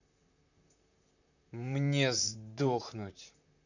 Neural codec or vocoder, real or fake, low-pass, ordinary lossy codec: vocoder, 44.1 kHz, 128 mel bands, Pupu-Vocoder; fake; 7.2 kHz; none